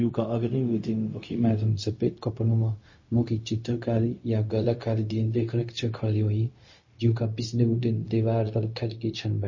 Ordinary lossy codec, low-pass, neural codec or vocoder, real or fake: MP3, 32 kbps; 7.2 kHz; codec, 16 kHz, 0.4 kbps, LongCat-Audio-Codec; fake